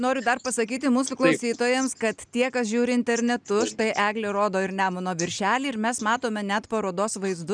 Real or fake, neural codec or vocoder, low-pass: real; none; 9.9 kHz